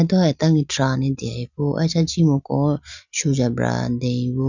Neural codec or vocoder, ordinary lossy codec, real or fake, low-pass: none; none; real; 7.2 kHz